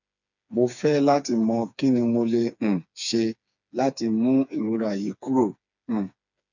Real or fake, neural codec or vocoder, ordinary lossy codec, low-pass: fake; codec, 16 kHz, 4 kbps, FreqCodec, smaller model; none; 7.2 kHz